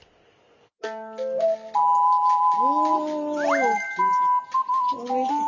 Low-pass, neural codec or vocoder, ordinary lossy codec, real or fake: 7.2 kHz; none; MP3, 64 kbps; real